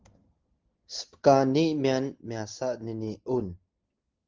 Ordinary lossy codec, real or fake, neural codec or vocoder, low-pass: Opus, 16 kbps; real; none; 7.2 kHz